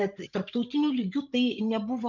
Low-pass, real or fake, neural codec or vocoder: 7.2 kHz; fake; codec, 16 kHz, 16 kbps, FreqCodec, larger model